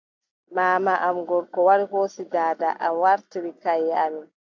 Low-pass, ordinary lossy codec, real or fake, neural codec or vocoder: 7.2 kHz; AAC, 48 kbps; real; none